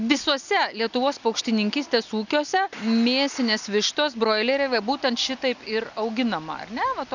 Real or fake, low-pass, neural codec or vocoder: real; 7.2 kHz; none